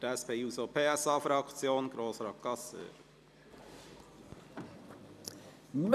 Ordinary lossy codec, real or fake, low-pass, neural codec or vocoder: none; fake; 14.4 kHz; vocoder, 44.1 kHz, 128 mel bands every 256 samples, BigVGAN v2